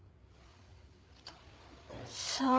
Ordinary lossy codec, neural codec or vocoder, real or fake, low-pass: none; codec, 16 kHz, 8 kbps, FreqCodec, larger model; fake; none